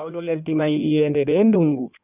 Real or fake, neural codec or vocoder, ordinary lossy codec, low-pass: fake; codec, 16 kHz in and 24 kHz out, 1.1 kbps, FireRedTTS-2 codec; none; 3.6 kHz